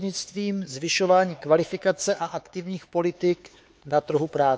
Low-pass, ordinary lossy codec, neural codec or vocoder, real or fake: none; none; codec, 16 kHz, 4 kbps, X-Codec, HuBERT features, trained on LibriSpeech; fake